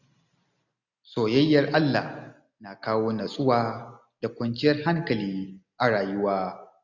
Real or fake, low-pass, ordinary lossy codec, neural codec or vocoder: real; 7.2 kHz; none; none